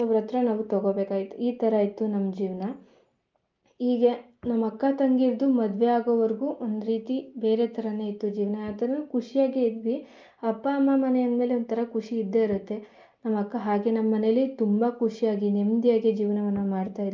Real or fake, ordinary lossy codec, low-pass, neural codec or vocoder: real; Opus, 24 kbps; 7.2 kHz; none